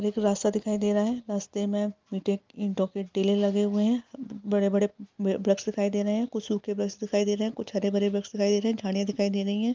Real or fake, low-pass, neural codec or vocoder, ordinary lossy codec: real; 7.2 kHz; none; Opus, 32 kbps